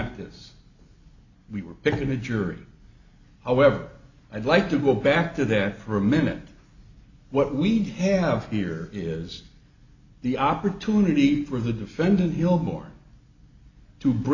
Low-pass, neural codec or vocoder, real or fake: 7.2 kHz; none; real